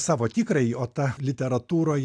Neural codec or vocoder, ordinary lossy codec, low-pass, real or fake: none; AAC, 64 kbps; 9.9 kHz; real